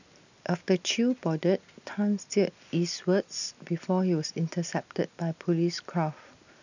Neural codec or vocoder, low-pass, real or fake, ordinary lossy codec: none; 7.2 kHz; real; none